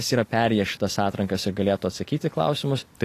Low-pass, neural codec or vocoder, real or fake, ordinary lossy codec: 14.4 kHz; none; real; AAC, 48 kbps